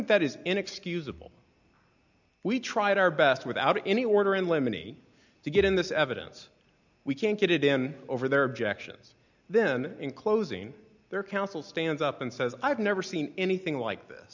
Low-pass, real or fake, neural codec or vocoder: 7.2 kHz; real; none